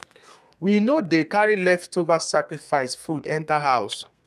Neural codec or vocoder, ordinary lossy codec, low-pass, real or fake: codec, 32 kHz, 1.9 kbps, SNAC; none; 14.4 kHz; fake